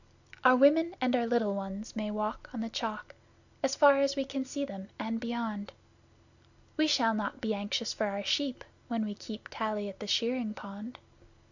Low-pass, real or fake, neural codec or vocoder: 7.2 kHz; real; none